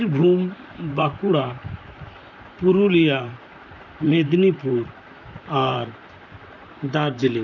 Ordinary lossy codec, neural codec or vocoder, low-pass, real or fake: none; vocoder, 44.1 kHz, 128 mel bands, Pupu-Vocoder; 7.2 kHz; fake